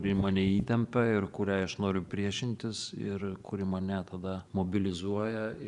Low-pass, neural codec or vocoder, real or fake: 10.8 kHz; vocoder, 24 kHz, 100 mel bands, Vocos; fake